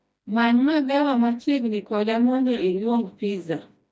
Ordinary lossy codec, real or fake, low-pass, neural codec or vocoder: none; fake; none; codec, 16 kHz, 1 kbps, FreqCodec, smaller model